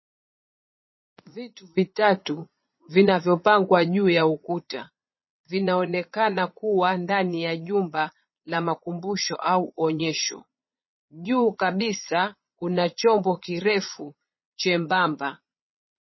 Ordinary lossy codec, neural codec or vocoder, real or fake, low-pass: MP3, 24 kbps; vocoder, 22.05 kHz, 80 mel bands, Vocos; fake; 7.2 kHz